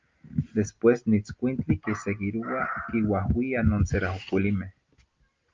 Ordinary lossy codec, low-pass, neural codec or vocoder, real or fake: Opus, 24 kbps; 7.2 kHz; none; real